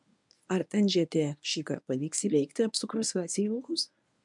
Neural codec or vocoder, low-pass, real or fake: codec, 24 kHz, 0.9 kbps, WavTokenizer, medium speech release version 1; 10.8 kHz; fake